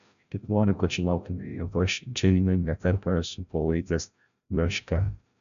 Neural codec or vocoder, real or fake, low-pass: codec, 16 kHz, 0.5 kbps, FreqCodec, larger model; fake; 7.2 kHz